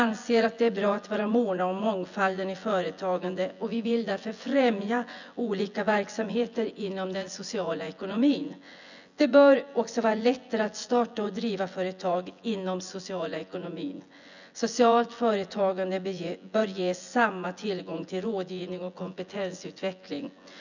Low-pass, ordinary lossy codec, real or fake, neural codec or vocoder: 7.2 kHz; none; fake; vocoder, 24 kHz, 100 mel bands, Vocos